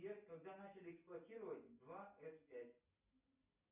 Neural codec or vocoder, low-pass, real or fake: none; 3.6 kHz; real